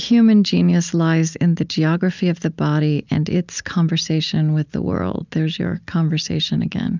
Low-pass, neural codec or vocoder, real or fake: 7.2 kHz; none; real